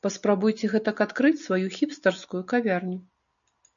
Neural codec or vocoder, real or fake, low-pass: none; real; 7.2 kHz